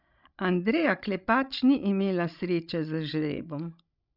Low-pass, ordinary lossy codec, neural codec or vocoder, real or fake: 5.4 kHz; none; codec, 16 kHz, 16 kbps, FreqCodec, larger model; fake